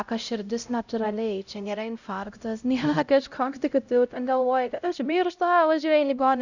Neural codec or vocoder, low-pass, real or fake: codec, 16 kHz, 0.5 kbps, X-Codec, HuBERT features, trained on LibriSpeech; 7.2 kHz; fake